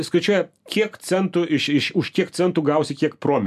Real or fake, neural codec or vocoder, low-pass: real; none; 14.4 kHz